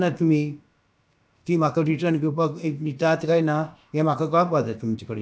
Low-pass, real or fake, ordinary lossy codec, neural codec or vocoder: none; fake; none; codec, 16 kHz, about 1 kbps, DyCAST, with the encoder's durations